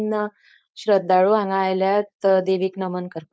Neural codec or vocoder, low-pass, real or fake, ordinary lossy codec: codec, 16 kHz, 4.8 kbps, FACodec; none; fake; none